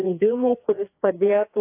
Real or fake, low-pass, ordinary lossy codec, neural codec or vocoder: fake; 3.6 kHz; AAC, 24 kbps; codec, 16 kHz, 2 kbps, FreqCodec, larger model